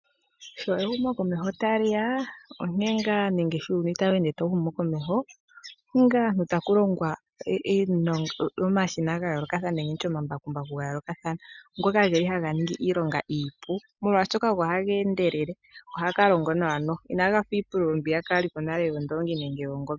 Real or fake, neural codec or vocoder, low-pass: real; none; 7.2 kHz